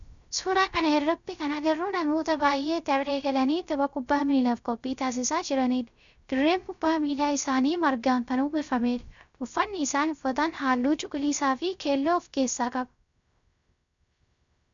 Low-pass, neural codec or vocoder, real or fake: 7.2 kHz; codec, 16 kHz, 0.3 kbps, FocalCodec; fake